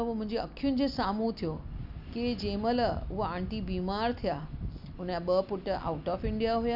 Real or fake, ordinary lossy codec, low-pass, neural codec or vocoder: real; none; 5.4 kHz; none